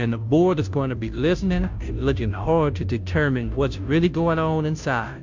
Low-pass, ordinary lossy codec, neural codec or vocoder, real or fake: 7.2 kHz; MP3, 64 kbps; codec, 16 kHz, 0.5 kbps, FunCodec, trained on Chinese and English, 25 frames a second; fake